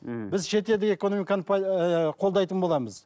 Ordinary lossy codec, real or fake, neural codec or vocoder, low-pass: none; real; none; none